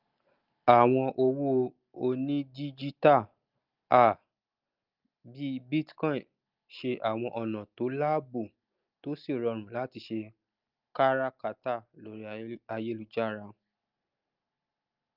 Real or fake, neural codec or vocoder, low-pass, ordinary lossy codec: real; none; 5.4 kHz; Opus, 24 kbps